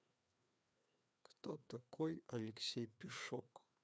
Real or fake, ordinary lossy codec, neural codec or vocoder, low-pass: fake; none; codec, 16 kHz, 2 kbps, FreqCodec, larger model; none